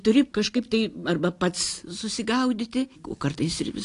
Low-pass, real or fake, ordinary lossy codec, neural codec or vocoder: 10.8 kHz; real; AAC, 48 kbps; none